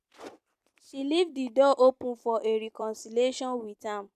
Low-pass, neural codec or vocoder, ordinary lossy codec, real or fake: 14.4 kHz; vocoder, 44.1 kHz, 128 mel bands every 256 samples, BigVGAN v2; none; fake